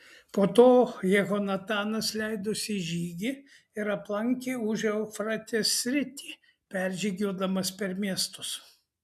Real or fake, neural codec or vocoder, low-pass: real; none; 14.4 kHz